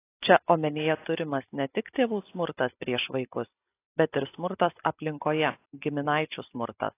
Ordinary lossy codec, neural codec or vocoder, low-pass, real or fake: AAC, 24 kbps; none; 3.6 kHz; real